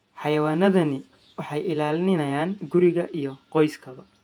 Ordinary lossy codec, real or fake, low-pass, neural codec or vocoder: none; fake; 19.8 kHz; vocoder, 48 kHz, 128 mel bands, Vocos